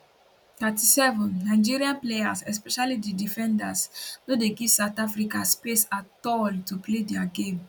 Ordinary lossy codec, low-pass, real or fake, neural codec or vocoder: none; none; real; none